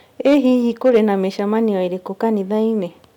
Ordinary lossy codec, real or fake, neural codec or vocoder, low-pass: none; real; none; 19.8 kHz